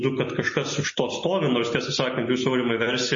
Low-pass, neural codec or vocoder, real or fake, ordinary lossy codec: 7.2 kHz; none; real; MP3, 32 kbps